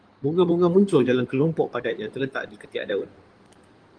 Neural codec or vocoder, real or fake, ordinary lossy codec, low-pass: codec, 16 kHz in and 24 kHz out, 2.2 kbps, FireRedTTS-2 codec; fake; Opus, 24 kbps; 9.9 kHz